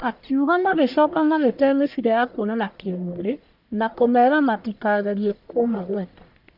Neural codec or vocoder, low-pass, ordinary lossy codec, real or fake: codec, 44.1 kHz, 1.7 kbps, Pupu-Codec; 5.4 kHz; Opus, 64 kbps; fake